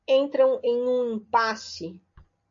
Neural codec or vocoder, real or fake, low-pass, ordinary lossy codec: none; real; 7.2 kHz; MP3, 48 kbps